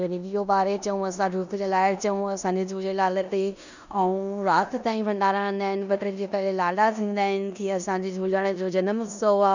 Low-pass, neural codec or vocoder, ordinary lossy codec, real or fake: 7.2 kHz; codec, 16 kHz in and 24 kHz out, 0.9 kbps, LongCat-Audio-Codec, four codebook decoder; none; fake